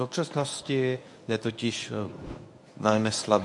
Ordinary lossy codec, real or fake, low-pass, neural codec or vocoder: AAC, 64 kbps; fake; 10.8 kHz; codec, 24 kHz, 0.9 kbps, WavTokenizer, medium speech release version 1